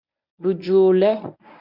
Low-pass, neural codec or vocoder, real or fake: 5.4 kHz; codec, 24 kHz, 0.9 kbps, WavTokenizer, medium speech release version 1; fake